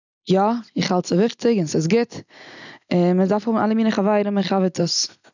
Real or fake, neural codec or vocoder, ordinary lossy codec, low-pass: real; none; none; 7.2 kHz